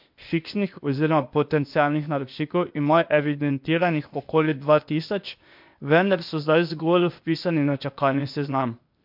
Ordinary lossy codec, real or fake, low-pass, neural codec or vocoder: MP3, 48 kbps; fake; 5.4 kHz; codec, 16 kHz, 0.8 kbps, ZipCodec